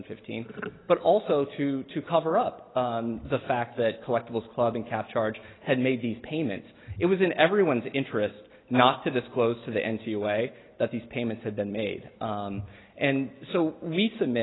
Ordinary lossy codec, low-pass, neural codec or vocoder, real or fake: AAC, 16 kbps; 7.2 kHz; none; real